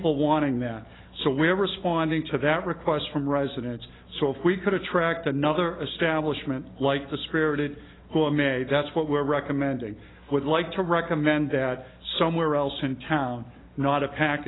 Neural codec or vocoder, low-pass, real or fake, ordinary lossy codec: none; 7.2 kHz; real; AAC, 16 kbps